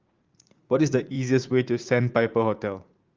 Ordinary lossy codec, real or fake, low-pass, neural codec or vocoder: Opus, 32 kbps; fake; 7.2 kHz; vocoder, 44.1 kHz, 80 mel bands, Vocos